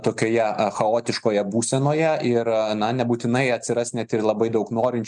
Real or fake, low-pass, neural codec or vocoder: real; 10.8 kHz; none